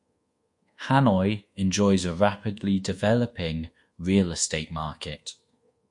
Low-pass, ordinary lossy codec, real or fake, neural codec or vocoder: 10.8 kHz; MP3, 48 kbps; fake; codec, 24 kHz, 1.2 kbps, DualCodec